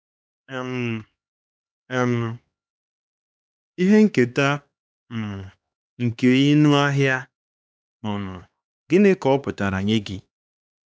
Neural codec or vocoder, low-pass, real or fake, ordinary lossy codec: codec, 16 kHz, 2 kbps, X-Codec, HuBERT features, trained on LibriSpeech; none; fake; none